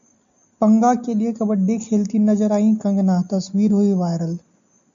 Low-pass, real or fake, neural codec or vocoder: 7.2 kHz; real; none